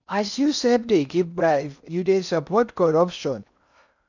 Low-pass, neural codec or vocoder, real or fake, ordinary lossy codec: 7.2 kHz; codec, 16 kHz in and 24 kHz out, 0.6 kbps, FocalCodec, streaming, 4096 codes; fake; none